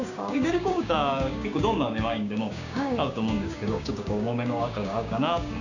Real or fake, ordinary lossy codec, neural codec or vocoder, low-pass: fake; none; codec, 16 kHz, 6 kbps, DAC; 7.2 kHz